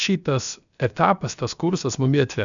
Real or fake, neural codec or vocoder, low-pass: fake; codec, 16 kHz, about 1 kbps, DyCAST, with the encoder's durations; 7.2 kHz